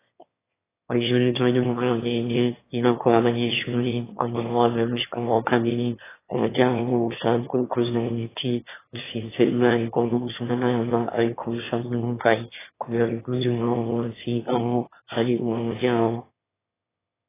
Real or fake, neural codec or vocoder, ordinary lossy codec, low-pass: fake; autoencoder, 22.05 kHz, a latent of 192 numbers a frame, VITS, trained on one speaker; AAC, 16 kbps; 3.6 kHz